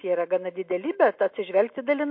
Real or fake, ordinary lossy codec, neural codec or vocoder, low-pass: real; MP3, 32 kbps; none; 5.4 kHz